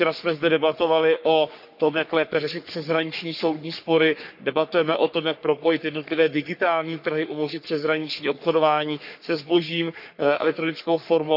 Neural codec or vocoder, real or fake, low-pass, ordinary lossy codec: codec, 44.1 kHz, 3.4 kbps, Pupu-Codec; fake; 5.4 kHz; none